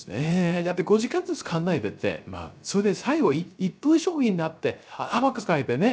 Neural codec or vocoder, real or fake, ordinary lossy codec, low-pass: codec, 16 kHz, 0.3 kbps, FocalCodec; fake; none; none